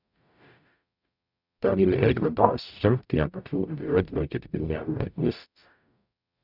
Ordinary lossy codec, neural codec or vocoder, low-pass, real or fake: none; codec, 44.1 kHz, 0.9 kbps, DAC; 5.4 kHz; fake